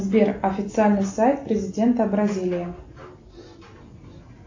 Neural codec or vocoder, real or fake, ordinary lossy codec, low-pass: none; real; AAC, 48 kbps; 7.2 kHz